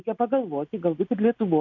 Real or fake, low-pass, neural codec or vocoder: real; 7.2 kHz; none